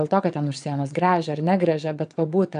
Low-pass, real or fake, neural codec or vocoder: 9.9 kHz; fake; vocoder, 22.05 kHz, 80 mel bands, WaveNeXt